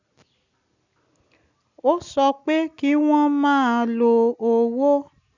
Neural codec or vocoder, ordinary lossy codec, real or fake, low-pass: none; none; real; 7.2 kHz